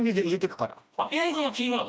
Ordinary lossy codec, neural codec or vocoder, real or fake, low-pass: none; codec, 16 kHz, 1 kbps, FreqCodec, smaller model; fake; none